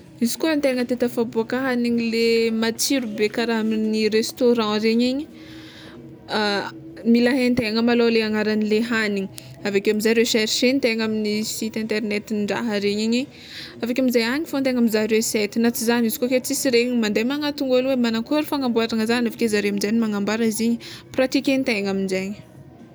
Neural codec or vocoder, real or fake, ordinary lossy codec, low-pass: none; real; none; none